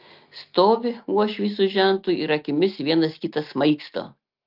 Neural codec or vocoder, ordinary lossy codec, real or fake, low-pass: none; Opus, 24 kbps; real; 5.4 kHz